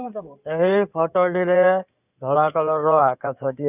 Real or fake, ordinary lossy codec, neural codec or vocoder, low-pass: fake; none; codec, 16 kHz in and 24 kHz out, 2.2 kbps, FireRedTTS-2 codec; 3.6 kHz